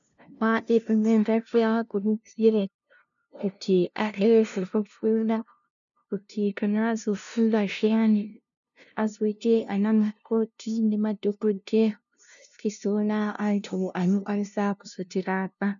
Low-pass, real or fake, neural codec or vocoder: 7.2 kHz; fake; codec, 16 kHz, 0.5 kbps, FunCodec, trained on LibriTTS, 25 frames a second